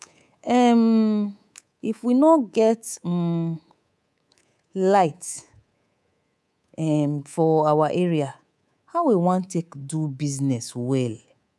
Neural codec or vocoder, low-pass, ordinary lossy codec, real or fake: codec, 24 kHz, 3.1 kbps, DualCodec; none; none; fake